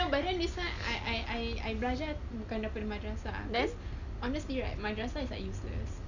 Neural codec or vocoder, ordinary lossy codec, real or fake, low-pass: none; none; real; 7.2 kHz